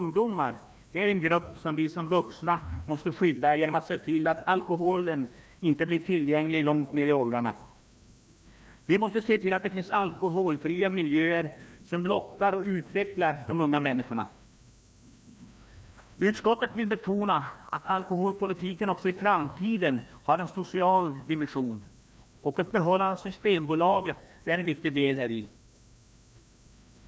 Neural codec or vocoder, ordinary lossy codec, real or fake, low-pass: codec, 16 kHz, 1 kbps, FreqCodec, larger model; none; fake; none